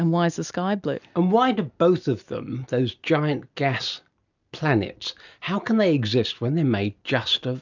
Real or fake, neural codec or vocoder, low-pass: real; none; 7.2 kHz